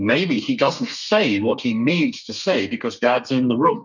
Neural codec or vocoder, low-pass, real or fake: codec, 32 kHz, 1.9 kbps, SNAC; 7.2 kHz; fake